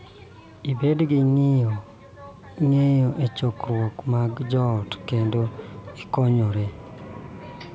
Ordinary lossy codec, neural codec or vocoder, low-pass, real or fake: none; none; none; real